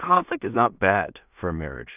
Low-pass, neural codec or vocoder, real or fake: 3.6 kHz; codec, 16 kHz in and 24 kHz out, 0.4 kbps, LongCat-Audio-Codec, two codebook decoder; fake